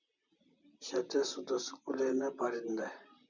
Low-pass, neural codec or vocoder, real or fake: 7.2 kHz; vocoder, 44.1 kHz, 128 mel bands, Pupu-Vocoder; fake